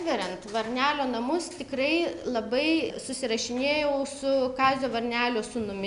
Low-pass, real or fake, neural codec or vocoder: 10.8 kHz; real; none